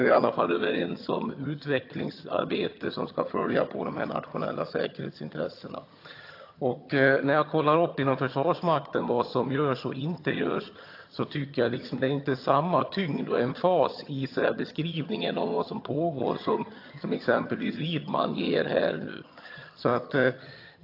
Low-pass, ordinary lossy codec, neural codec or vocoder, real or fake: 5.4 kHz; AAC, 32 kbps; vocoder, 22.05 kHz, 80 mel bands, HiFi-GAN; fake